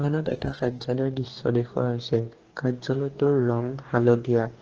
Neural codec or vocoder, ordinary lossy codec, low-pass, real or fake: codec, 44.1 kHz, 2.6 kbps, DAC; Opus, 16 kbps; 7.2 kHz; fake